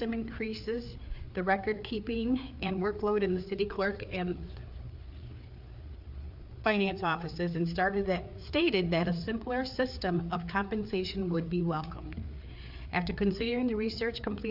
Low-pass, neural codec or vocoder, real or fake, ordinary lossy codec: 5.4 kHz; codec, 16 kHz, 4 kbps, FreqCodec, larger model; fake; AAC, 48 kbps